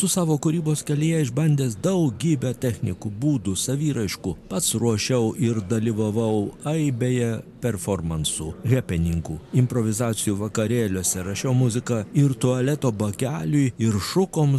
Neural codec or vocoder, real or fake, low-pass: none; real; 14.4 kHz